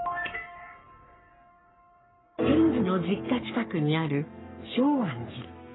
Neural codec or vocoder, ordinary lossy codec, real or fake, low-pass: codec, 44.1 kHz, 3.4 kbps, Pupu-Codec; AAC, 16 kbps; fake; 7.2 kHz